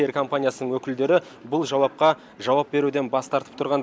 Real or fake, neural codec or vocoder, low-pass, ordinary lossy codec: real; none; none; none